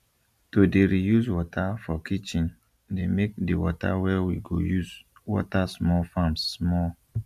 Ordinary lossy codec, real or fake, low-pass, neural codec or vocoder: none; real; 14.4 kHz; none